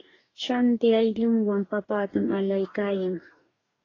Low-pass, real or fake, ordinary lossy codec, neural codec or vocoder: 7.2 kHz; fake; AAC, 32 kbps; codec, 44.1 kHz, 2.6 kbps, DAC